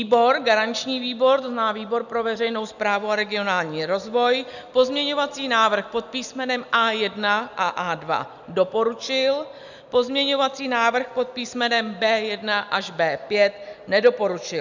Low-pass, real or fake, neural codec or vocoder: 7.2 kHz; real; none